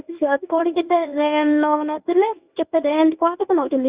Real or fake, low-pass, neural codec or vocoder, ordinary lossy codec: fake; 3.6 kHz; codec, 24 kHz, 0.9 kbps, WavTokenizer, medium speech release version 1; Opus, 64 kbps